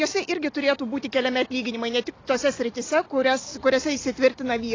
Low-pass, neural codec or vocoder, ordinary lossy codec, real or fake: 7.2 kHz; none; AAC, 32 kbps; real